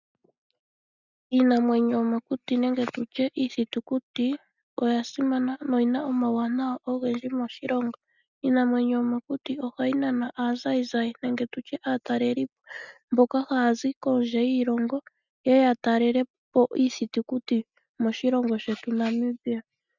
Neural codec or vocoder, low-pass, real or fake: none; 7.2 kHz; real